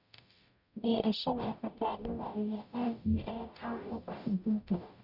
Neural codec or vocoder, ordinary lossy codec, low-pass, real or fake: codec, 44.1 kHz, 0.9 kbps, DAC; none; 5.4 kHz; fake